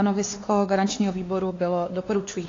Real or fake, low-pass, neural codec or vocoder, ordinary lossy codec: fake; 7.2 kHz; codec, 16 kHz, 2 kbps, X-Codec, WavLM features, trained on Multilingual LibriSpeech; AAC, 32 kbps